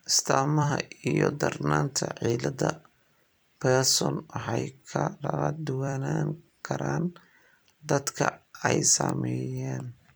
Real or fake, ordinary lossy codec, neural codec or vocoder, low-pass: fake; none; vocoder, 44.1 kHz, 128 mel bands every 256 samples, BigVGAN v2; none